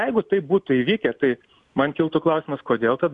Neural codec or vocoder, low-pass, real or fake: none; 10.8 kHz; real